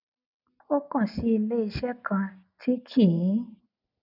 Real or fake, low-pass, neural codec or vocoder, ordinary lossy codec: real; 5.4 kHz; none; none